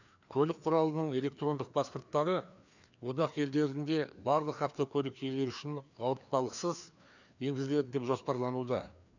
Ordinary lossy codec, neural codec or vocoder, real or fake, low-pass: none; codec, 16 kHz, 2 kbps, FreqCodec, larger model; fake; 7.2 kHz